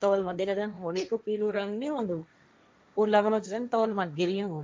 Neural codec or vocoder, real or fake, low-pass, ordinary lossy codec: codec, 16 kHz, 1.1 kbps, Voila-Tokenizer; fake; none; none